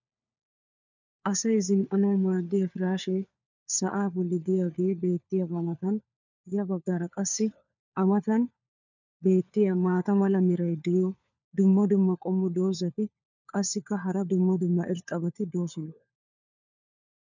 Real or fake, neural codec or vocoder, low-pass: fake; codec, 16 kHz, 4 kbps, FunCodec, trained on LibriTTS, 50 frames a second; 7.2 kHz